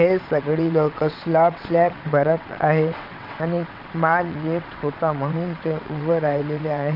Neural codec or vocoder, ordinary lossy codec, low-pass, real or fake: vocoder, 22.05 kHz, 80 mel bands, WaveNeXt; none; 5.4 kHz; fake